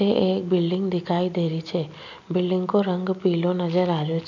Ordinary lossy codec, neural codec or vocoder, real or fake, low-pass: none; none; real; 7.2 kHz